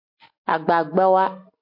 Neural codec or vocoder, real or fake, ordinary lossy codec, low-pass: none; real; MP3, 32 kbps; 5.4 kHz